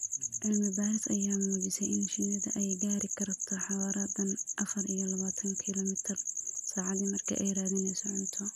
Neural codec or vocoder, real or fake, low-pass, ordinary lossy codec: none; real; 14.4 kHz; none